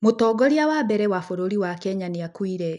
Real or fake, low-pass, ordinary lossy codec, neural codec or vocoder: real; 10.8 kHz; none; none